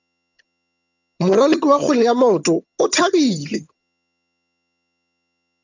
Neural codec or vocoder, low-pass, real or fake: vocoder, 22.05 kHz, 80 mel bands, HiFi-GAN; 7.2 kHz; fake